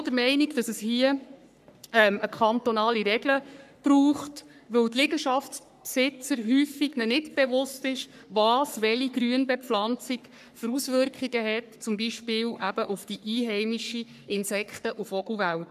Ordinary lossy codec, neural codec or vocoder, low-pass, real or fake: none; codec, 44.1 kHz, 3.4 kbps, Pupu-Codec; 14.4 kHz; fake